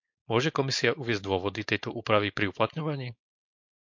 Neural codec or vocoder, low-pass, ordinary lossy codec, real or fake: codec, 16 kHz, 4.8 kbps, FACodec; 7.2 kHz; MP3, 48 kbps; fake